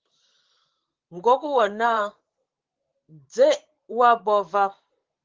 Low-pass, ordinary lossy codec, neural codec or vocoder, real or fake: 7.2 kHz; Opus, 16 kbps; vocoder, 44.1 kHz, 128 mel bands, Pupu-Vocoder; fake